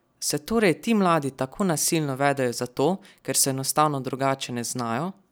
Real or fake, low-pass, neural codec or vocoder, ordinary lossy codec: real; none; none; none